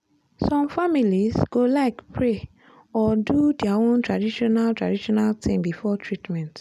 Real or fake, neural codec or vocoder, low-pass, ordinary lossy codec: real; none; 14.4 kHz; none